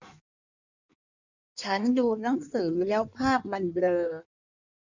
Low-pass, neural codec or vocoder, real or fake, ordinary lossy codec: 7.2 kHz; codec, 16 kHz in and 24 kHz out, 1.1 kbps, FireRedTTS-2 codec; fake; none